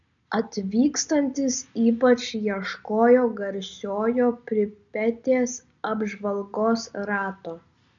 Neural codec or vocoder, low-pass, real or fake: none; 7.2 kHz; real